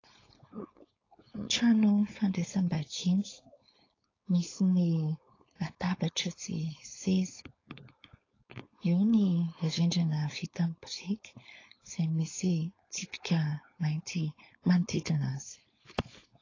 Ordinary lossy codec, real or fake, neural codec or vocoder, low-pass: AAC, 32 kbps; fake; codec, 16 kHz, 4.8 kbps, FACodec; 7.2 kHz